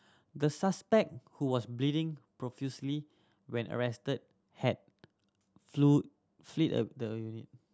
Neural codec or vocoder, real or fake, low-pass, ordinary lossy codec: none; real; none; none